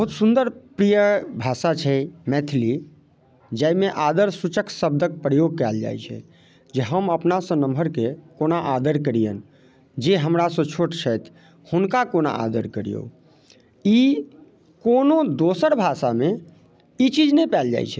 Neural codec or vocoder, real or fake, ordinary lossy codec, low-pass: none; real; none; none